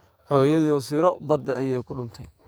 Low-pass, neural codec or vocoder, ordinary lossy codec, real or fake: none; codec, 44.1 kHz, 2.6 kbps, SNAC; none; fake